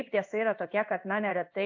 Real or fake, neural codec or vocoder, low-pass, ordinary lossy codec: fake; codec, 16 kHz in and 24 kHz out, 1 kbps, XY-Tokenizer; 7.2 kHz; AAC, 48 kbps